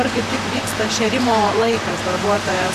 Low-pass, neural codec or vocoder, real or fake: 14.4 kHz; vocoder, 44.1 kHz, 128 mel bands, Pupu-Vocoder; fake